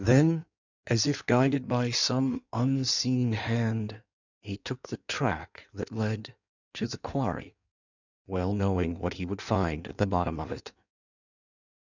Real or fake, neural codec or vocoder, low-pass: fake; codec, 16 kHz in and 24 kHz out, 1.1 kbps, FireRedTTS-2 codec; 7.2 kHz